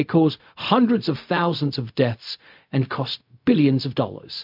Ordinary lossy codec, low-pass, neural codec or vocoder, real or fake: MP3, 48 kbps; 5.4 kHz; codec, 16 kHz, 0.4 kbps, LongCat-Audio-Codec; fake